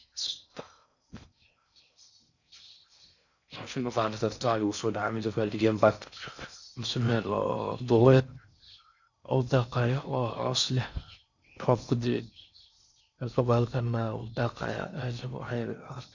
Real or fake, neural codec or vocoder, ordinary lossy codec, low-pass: fake; codec, 16 kHz in and 24 kHz out, 0.6 kbps, FocalCodec, streaming, 4096 codes; none; 7.2 kHz